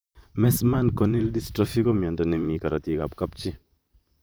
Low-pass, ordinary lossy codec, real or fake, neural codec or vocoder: none; none; fake; vocoder, 44.1 kHz, 128 mel bands, Pupu-Vocoder